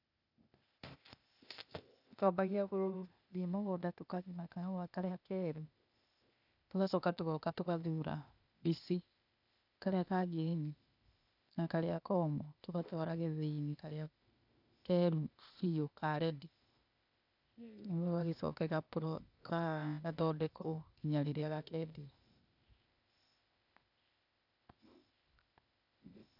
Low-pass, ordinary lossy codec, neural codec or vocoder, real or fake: 5.4 kHz; none; codec, 16 kHz, 0.8 kbps, ZipCodec; fake